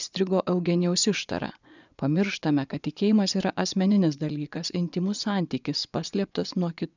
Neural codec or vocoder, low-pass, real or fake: vocoder, 24 kHz, 100 mel bands, Vocos; 7.2 kHz; fake